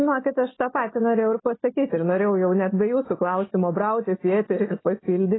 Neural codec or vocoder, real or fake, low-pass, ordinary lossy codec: none; real; 7.2 kHz; AAC, 16 kbps